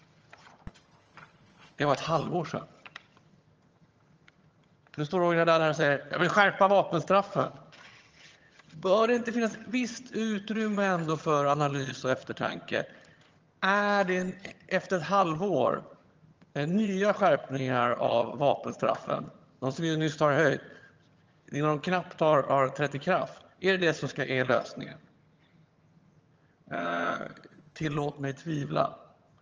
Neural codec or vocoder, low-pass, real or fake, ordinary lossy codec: vocoder, 22.05 kHz, 80 mel bands, HiFi-GAN; 7.2 kHz; fake; Opus, 24 kbps